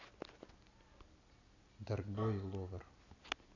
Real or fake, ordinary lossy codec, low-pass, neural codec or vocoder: real; none; 7.2 kHz; none